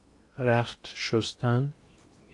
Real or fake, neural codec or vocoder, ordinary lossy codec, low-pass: fake; codec, 16 kHz in and 24 kHz out, 0.8 kbps, FocalCodec, streaming, 65536 codes; AAC, 48 kbps; 10.8 kHz